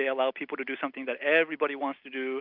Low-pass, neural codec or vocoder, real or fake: 5.4 kHz; none; real